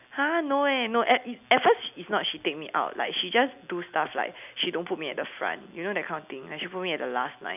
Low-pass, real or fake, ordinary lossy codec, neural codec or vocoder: 3.6 kHz; real; none; none